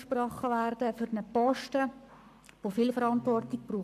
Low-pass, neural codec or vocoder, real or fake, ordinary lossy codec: 14.4 kHz; codec, 44.1 kHz, 7.8 kbps, Pupu-Codec; fake; none